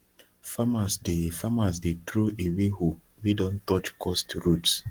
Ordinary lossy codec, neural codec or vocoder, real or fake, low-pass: Opus, 24 kbps; codec, 44.1 kHz, 7.8 kbps, Pupu-Codec; fake; 19.8 kHz